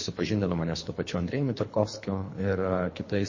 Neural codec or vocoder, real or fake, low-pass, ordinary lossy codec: codec, 24 kHz, 3 kbps, HILCodec; fake; 7.2 kHz; MP3, 32 kbps